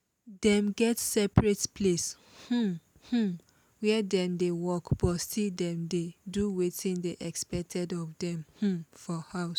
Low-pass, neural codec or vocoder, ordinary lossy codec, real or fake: none; none; none; real